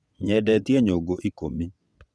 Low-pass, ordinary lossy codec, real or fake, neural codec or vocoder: none; none; fake; vocoder, 22.05 kHz, 80 mel bands, WaveNeXt